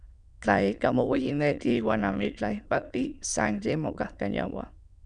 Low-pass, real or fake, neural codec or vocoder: 9.9 kHz; fake; autoencoder, 22.05 kHz, a latent of 192 numbers a frame, VITS, trained on many speakers